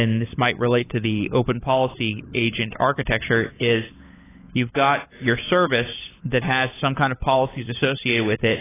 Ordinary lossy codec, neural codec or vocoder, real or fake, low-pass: AAC, 16 kbps; codec, 16 kHz, 16 kbps, FunCodec, trained on LibriTTS, 50 frames a second; fake; 3.6 kHz